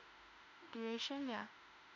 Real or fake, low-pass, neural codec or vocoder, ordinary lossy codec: fake; 7.2 kHz; autoencoder, 48 kHz, 32 numbers a frame, DAC-VAE, trained on Japanese speech; none